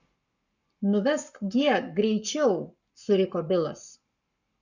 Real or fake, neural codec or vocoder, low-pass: fake; codec, 44.1 kHz, 7.8 kbps, Pupu-Codec; 7.2 kHz